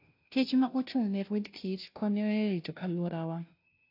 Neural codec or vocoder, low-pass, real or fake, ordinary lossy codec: codec, 16 kHz, 0.5 kbps, FunCodec, trained on Chinese and English, 25 frames a second; 5.4 kHz; fake; AAC, 32 kbps